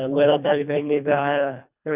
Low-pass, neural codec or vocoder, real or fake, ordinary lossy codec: 3.6 kHz; codec, 24 kHz, 1.5 kbps, HILCodec; fake; none